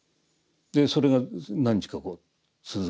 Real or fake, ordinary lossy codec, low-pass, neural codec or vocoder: real; none; none; none